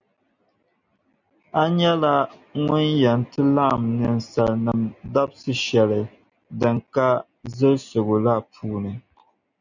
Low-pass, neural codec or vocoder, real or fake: 7.2 kHz; none; real